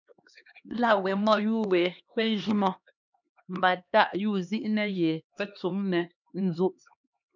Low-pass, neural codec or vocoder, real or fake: 7.2 kHz; codec, 16 kHz, 2 kbps, X-Codec, HuBERT features, trained on LibriSpeech; fake